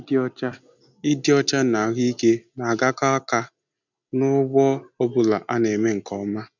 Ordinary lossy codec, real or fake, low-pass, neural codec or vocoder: none; real; 7.2 kHz; none